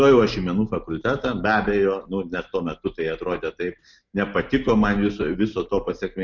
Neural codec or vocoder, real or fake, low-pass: none; real; 7.2 kHz